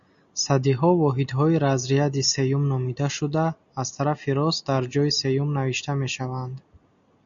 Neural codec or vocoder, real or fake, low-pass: none; real; 7.2 kHz